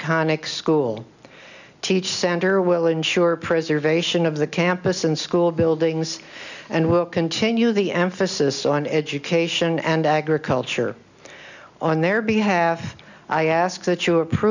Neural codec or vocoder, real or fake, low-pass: none; real; 7.2 kHz